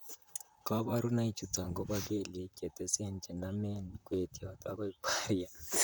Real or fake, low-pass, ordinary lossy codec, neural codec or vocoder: fake; none; none; vocoder, 44.1 kHz, 128 mel bands, Pupu-Vocoder